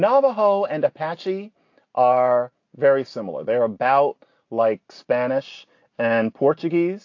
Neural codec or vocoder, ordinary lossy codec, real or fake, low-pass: none; AAC, 48 kbps; real; 7.2 kHz